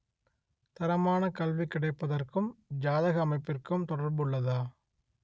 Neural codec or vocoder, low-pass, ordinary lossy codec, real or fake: none; none; none; real